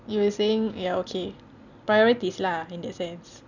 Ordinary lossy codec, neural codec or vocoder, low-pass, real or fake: none; none; 7.2 kHz; real